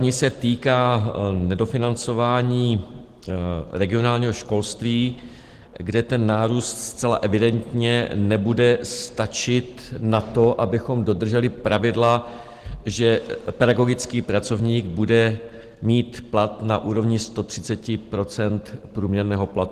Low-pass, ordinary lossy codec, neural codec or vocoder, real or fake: 14.4 kHz; Opus, 16 kbps; none; real